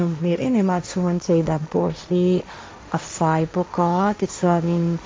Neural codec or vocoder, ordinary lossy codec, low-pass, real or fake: codec, 16 kHz, 1.1 kbps, Voila-Tokenizer; none; none; fake